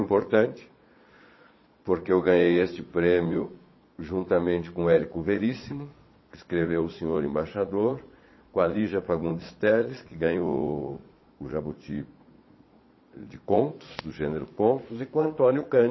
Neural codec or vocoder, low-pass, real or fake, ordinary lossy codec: vocoder, 22.05 kHz, 80 mel bands, Vocos; 7.2 kHz; fake; MP3, 24 kbps